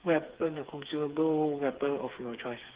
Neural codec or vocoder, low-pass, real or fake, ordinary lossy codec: codec, 16 kHz, 4 kbps, FreqCodec, smaller model; 3.6 kHz; fake; Opus, 16 kbps